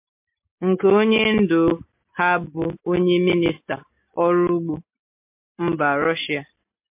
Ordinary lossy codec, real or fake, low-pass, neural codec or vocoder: MP3, 32 kbps; real; 3.6 kHz; none